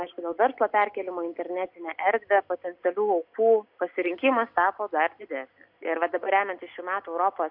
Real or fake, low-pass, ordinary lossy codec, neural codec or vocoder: real; 5.4 kHz; MP3, 48 kbps; none